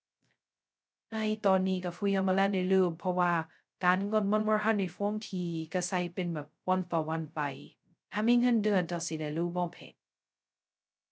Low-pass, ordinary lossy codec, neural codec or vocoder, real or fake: none; none; codec, 16 kHz, 0.2 kbps, FocalCodec; fake